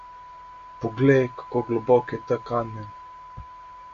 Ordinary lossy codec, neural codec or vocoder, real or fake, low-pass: AAC, 48 kbps; none; real; 7.2 kHz